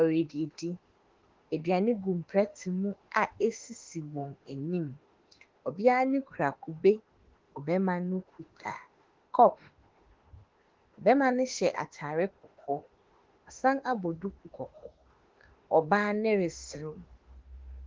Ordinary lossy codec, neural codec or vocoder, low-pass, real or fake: Opus, 16 kbps; autoencoder, 48 kHz, 32 numbers a frame, DAC-VAE, trained on Japanese speech; 7.2 kHz; fake